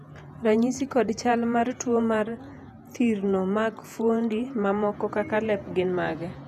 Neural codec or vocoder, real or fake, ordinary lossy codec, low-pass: vocoder, 48 kHz, 128 mel bands, Vocos; fake; none; 14.4 kHz